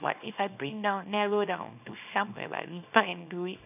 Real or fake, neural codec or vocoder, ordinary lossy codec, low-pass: fake; codec, 24 kHz, 0.9 kbps, WavTokenizer, small release; none; 3.6 kHz